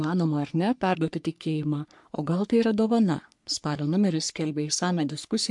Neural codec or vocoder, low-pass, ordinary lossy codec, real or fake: codec, 44.1 kHz, 3.4 kbps, Pupu-Codec; 10.8 kHz; MP3, 64 kbps; fake